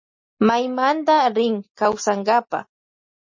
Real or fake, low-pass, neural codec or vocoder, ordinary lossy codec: real; 7.2 kHz; none; MP3, 32 kbps